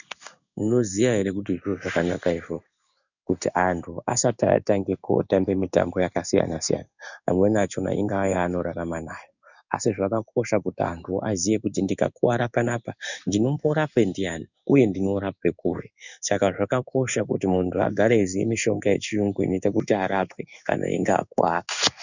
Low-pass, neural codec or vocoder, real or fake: 7.2 kHz; codec, 16 kHz in and 24 kHz out, 1 kbps, XY-Tokenizer; fake